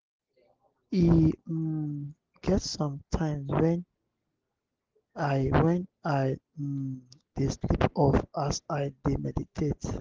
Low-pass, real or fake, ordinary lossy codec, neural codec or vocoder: 7.2 kHz; real; Opus, 16 kbps; none